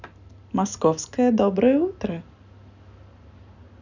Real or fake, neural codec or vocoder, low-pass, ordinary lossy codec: real; none; 7.2 kHz; none